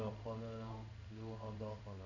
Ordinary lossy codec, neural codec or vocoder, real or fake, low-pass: none; codec, 16 kHz in and 24 kHz out, 1 kbps, XY-Tokenizer; fake; 7.2 kHz